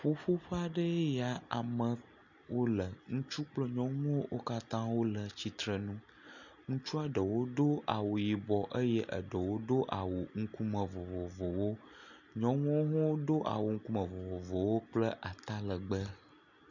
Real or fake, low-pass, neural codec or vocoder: real; 7.2 kHz; none